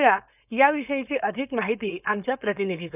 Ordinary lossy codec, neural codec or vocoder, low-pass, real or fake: none; codec, 16 kHz, 4.8 kbps, FACodec; 3.6 kHz; fake